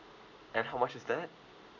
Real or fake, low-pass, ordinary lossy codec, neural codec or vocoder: real; 7.2 kHz; none; none